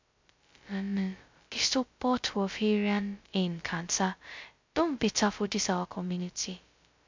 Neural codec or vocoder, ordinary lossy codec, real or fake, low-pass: codec, 16 kHz, 0.2 kbps, FocalCodec; MP3, 64 kbps; fake; 7.2 kHz